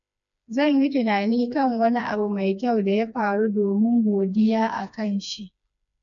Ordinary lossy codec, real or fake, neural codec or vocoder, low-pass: none; fake; codec, 16 kHz, 2 kbps, FreqCodec, smaller model; 7.2 kHz